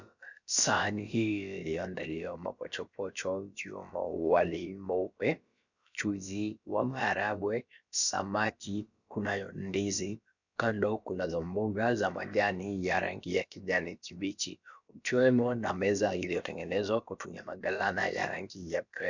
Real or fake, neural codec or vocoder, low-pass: fake; codec, 16 kHz, about 1 kbps, DyCAST, with the encoder's durations; 7.2 kHz